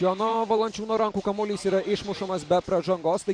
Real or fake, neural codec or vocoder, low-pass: fake; vocoder, 44.1 kHz, 128 mel bands every 512 samples, BigVGAN v2; 9.9 kHz